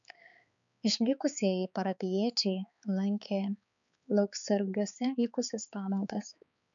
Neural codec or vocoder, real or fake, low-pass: codec, 16 kHz, 4 kbps, X-Codec, HuBERT features, trained on balanced general audio; fake; 7.2 kHz